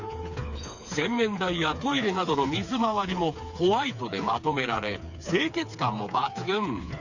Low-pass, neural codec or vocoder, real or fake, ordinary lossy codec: 7.2 kHz; codec, 16 kHz, 4 kbps, FreqCodec, smaller model; fake; none